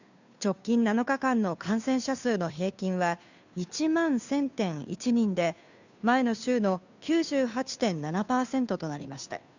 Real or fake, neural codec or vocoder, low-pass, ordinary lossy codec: fake; codec, 16 kHz, 2 kbps, FunCodec, trained on Chinese and English, 25 frames a second; 7.2 kHz; none